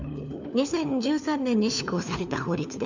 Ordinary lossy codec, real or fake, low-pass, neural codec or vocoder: none; fake; 7.2 kHz; codec, 16 kHz, 4 kbps, FunCodec, trained on LibriTTS, 50 frames a second